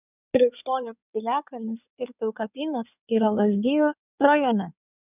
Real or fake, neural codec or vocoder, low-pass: fake; codec, 16 kHz in and 24 kHz out, 2.2 kbps, FireRedTTS-2 codec; 3.6 kHz